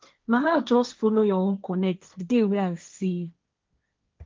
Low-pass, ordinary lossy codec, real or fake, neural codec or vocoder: 7.2 kHz; Opus, 24 kbps; fake; codec, 16 kHz, 1.1 kbps, Voila-Tokenizer